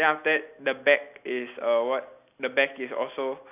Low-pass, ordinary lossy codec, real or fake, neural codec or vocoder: 3.6 kHz; none; real; none